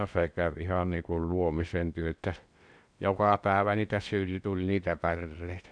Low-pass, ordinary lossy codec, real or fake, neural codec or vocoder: 9.9 kHz; none; fake; codec, 16 kHz in and 24 kHz out, 0.8 kbps, FocalCodec, streaming, 65536 codes